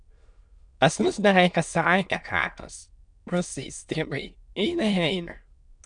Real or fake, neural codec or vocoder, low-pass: fake; autoencoder, 22.05 kHz, a latent of 192 numbers a frame, VITS, trained on many speakers; 9.9 kHz